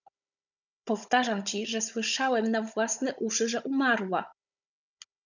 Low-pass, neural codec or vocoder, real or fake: 7.2 kHz; codec, 16 kHz, 16 kbps, FunCodec, trained on Chinese and English, 50 frames a second; fake